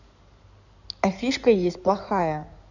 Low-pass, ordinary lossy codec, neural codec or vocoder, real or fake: 7.2 kHz; none; codec, 16 kHz in and 24 kHz out, 2.2 kbps, FireRedTTS-2 codec; fake